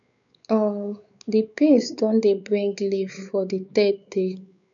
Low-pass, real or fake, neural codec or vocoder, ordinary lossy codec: 7.2 kHz; fake; codec, 16 kHz, 4 kbps, X-Codec, WavLM features, trained on Multilingual LibriSpeech; none